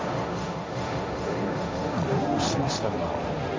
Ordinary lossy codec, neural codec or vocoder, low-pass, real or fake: none; codec, 16 kHz, 1.1 kbps, Voila-Tokenizer; none; fake